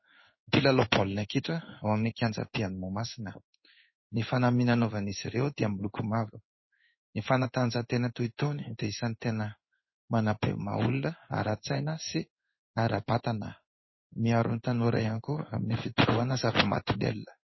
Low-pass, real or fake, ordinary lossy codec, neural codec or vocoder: 7.2 kHz; fake; MP3, 24 kbps; codec, 16 kHz in and 24 kHz out, 1 kbps, XY-Tokenizer